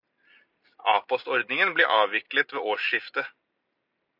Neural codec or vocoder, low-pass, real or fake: none; 5.4 kHz; real